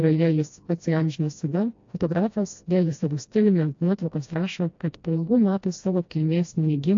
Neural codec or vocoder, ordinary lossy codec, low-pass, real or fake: codec, 16 kHz, 1 kbps, FreqCodec, smaller model; AAC, 48 kbps; 7.2 kHz; fake